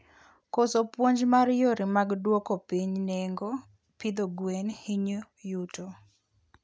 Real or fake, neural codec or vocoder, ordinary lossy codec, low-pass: real; none; none; none